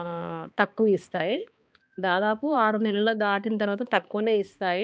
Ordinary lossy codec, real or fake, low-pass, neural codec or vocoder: none; fake; none; codec, 16 kHz, 2 kbps, X-Codec, HuBERT features, trained on balanced general audio